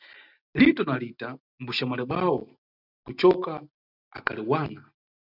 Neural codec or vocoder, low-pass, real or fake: none; 5.4 kHz; real